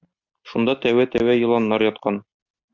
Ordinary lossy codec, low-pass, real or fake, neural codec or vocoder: Opus, 64 kbps; 7.2 kHz; real; none